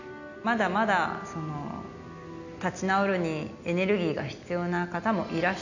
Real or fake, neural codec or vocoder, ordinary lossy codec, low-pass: real; none; none; 7.2 kHz